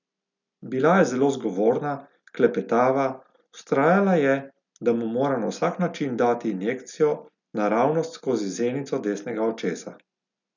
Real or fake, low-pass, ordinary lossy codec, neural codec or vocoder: real; 7.2 kHz; none; none